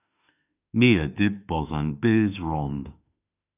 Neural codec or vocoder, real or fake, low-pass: autoencoder, 48 kHz, 32 numbers a frame, DAC-VAE, trained on Japanese speech; fake; 3.6 kHz